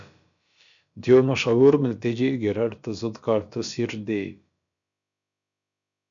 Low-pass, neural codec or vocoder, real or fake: 7.2 kHz; codec, 16 kHz, about 1 kbps, DyCAST, with the encoder's durations; fake